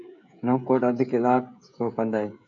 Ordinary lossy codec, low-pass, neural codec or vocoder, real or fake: MP3, 96 kbps; 7.2 kHz; codec, 16 kHz, 16 kbps, FreqCodec, smaller model; fake